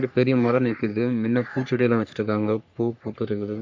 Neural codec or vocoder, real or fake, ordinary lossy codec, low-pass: codec, 44.1 kHz, 3.4 kbps, Pupu-Codec; fake; MP3, 48 kbps; 7.2 kHz